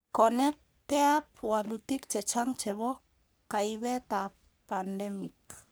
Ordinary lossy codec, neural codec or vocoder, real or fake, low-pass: none; codec, 44.1 kHz, 3.4 kbps, Pupu-Codec; fake; none